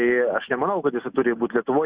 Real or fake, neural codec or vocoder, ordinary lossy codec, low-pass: real; none; Opus, 64 kbps; 3.6 kHz